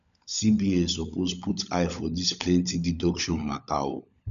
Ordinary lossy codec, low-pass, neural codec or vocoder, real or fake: none; 7.2 kHz; codec, 16 kHz, 16 kbps, FunCodec, trained on LibriTTS, 50 frames a second; fake